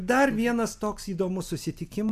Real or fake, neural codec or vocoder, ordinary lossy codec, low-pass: real; none; AAC, 96 kbps; 14.4 kHz